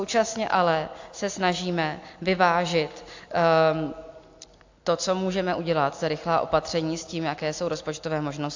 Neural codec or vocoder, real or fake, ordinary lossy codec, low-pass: none; real; AAC, 48 kbps; 7.2 kHz